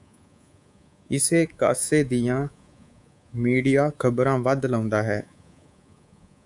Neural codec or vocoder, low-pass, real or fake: codec, 24 kHz, 3.1 kbps, DualCodec; 10.8 kHz; fake